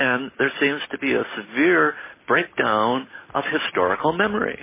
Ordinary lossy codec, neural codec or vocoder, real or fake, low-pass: MP3, 16 kbps; none; real; 3.6 kHz